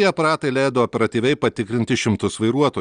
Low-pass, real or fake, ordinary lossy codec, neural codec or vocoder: 9.9 kHz; real; Opus, 32 kbps; none